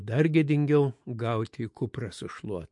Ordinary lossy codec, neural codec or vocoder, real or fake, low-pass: MP3, 48 kbps; autoencoder, 48 kHz, 128 numbers a frame, DAC-VAE, trained on Japanese speech; fake; 10.8 kHz